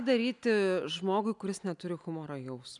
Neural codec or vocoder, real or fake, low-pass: none; real; 10.8 kHz